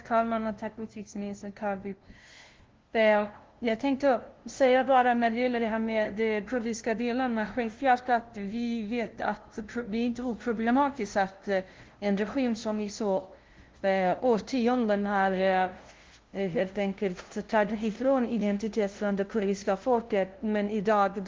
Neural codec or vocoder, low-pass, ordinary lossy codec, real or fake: codec, 16 kHz, 0.5 kbps, FunCodec, trained on LibriTTS, 25 frames a second; 7.2 kHz; Opus, 16 kbps; fake